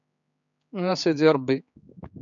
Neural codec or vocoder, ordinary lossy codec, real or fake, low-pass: codec, 16 kHz, 4 kbps, X-Codec, HuBERT features, trained on general audio; MP3, 96 kbps; fake; 7.2 kHz